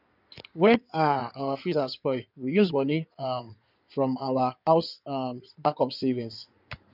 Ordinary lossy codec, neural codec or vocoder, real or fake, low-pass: none; codec, 16 kHz in and 24 kHz out, 2.2 kbps, FireRedTTS-2 codec; fake; 5.4 kHz